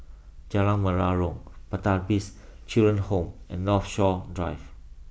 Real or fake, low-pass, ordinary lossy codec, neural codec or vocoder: real; none; none; none